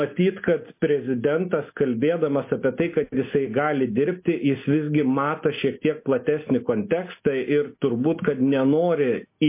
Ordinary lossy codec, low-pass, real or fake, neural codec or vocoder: MP3, 24 kbps; 3.6 kHz; real; none